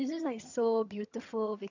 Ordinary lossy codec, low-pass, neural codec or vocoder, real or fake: none; 7.2 kHz; vocoder, 22.05 kHz, 80 mel bands, HiFi-GAN; fake